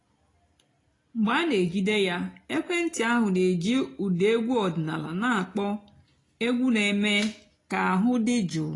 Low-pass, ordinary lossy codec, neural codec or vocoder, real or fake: 10.8 kHz; AAC, 32 kbps; none; real